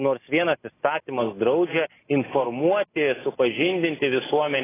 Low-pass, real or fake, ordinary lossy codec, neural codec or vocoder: 3.6 kHz; real; AAC, 16 kbps; none